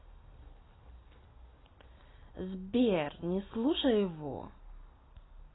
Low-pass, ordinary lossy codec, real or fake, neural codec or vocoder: 7.2 kHz; AAC, 16 kbps; real; none